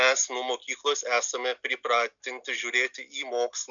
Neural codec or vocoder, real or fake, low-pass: none; real; 7.2 kHz